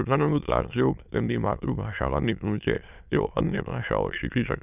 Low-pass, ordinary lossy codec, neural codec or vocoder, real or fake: 3.6 kHz; none; autoencoder, 22.05 kHz, a latent of 192 numbers a frame, VITS, trained on many speakers; fake